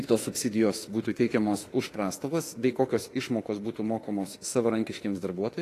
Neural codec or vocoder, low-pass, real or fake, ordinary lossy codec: autoencoder, 48 kHz, 32 numbers a frame, DAC-VAE, trained on Japanese speech; 14.4 kHz; fake; AAC, 48 kbps